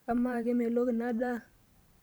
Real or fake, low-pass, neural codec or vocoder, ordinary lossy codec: fake; none; vocoder, 44.1 kHz, 128 mel bands every 512 samples, BigVGAN v2; none